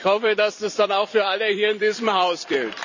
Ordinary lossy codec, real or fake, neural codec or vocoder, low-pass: none; fake; vocoder, 44.1 kHz, 128 mel bands every 256 samples, BigVGAN v2; 7.2 kHz